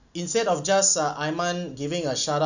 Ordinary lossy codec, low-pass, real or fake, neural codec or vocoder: none; 7.2 kHz; real; none